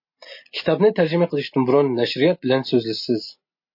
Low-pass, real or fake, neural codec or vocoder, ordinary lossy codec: 5.4 kHz; real; none; MP3, 32 kbps